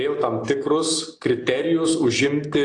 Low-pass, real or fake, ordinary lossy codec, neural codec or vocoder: 10.8 kHz; real; AAC, 48 kbps; none